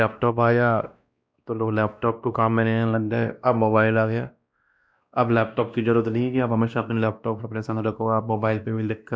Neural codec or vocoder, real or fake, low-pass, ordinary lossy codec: codec, 16 kHz, 1 kbps, X-Codec, WavLM features, trained on Multilingual LibriSpeech; fake; none; none